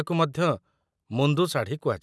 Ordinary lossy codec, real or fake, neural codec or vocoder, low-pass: none; real; none; none